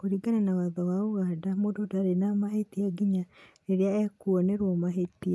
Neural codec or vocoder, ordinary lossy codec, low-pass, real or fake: none; none; none; real